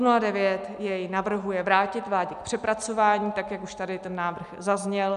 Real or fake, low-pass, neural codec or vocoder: real; 10.8 kHz; none